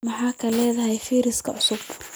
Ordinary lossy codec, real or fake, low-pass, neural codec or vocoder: none; real; none; none